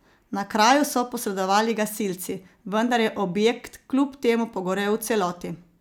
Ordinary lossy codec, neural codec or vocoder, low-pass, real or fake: none; none; none; real